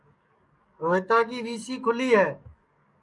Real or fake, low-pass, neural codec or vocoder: fake; 10.8 kHz; codec, 44.1 kHz, 7.8 kbps, DAC